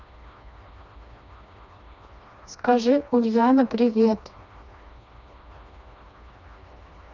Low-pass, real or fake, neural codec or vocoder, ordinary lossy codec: 7.2 kHz; fake; codec, 16 kHz, 2 kbps, FreqCodec, smaller model; none